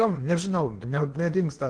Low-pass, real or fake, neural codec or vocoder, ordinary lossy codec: 9.9 kHz; fake; codec, 16 kHz in and 24 kHz out, 0.8 kbps, FocalCodec, streaming, 65536 codes; Opus, 16 kbps